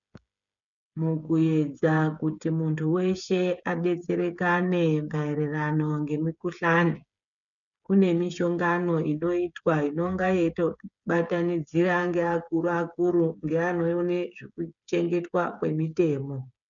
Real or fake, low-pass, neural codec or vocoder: fake; 7.2 kHz; codec, 16 kHz, 8 kbps, FreqCodec, smaller model